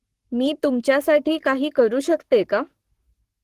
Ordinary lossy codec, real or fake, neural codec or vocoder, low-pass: Opus, 16 kbps; fake; codec, 44.1 kHz, 7.8 kbps, Pupu-Codec; 14.4 kHz